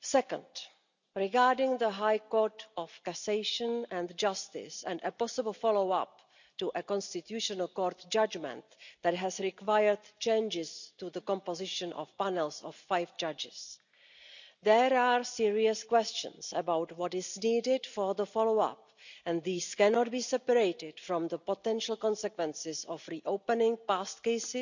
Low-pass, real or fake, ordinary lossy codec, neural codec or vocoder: 7.2 kHz; real; none; none